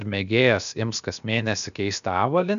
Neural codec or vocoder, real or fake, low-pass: codec, 16 kHz, about 1 kbps, DyCAST, with the encoder's durations; fake; 7.2 kHz